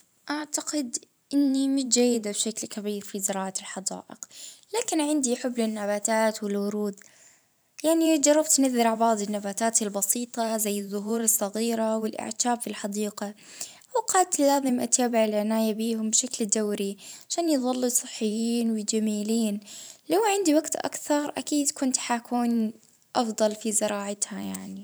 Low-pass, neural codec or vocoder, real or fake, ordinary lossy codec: none; vocoder, 44.1 kHz, 128 mel bands every 512 samples, BigVGAN v2; fake; none